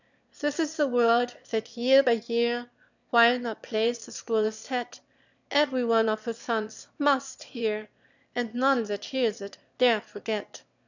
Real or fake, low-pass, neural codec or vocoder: fake; 7.2 kHz; autoencoder, 22.05 kHz, a latent of 192 numbers a frame, VITS, trained on one speaker